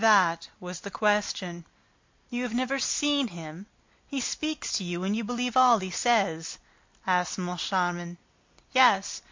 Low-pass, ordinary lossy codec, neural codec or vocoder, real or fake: 7.2 kHz; MP3, 48 kbps; none; real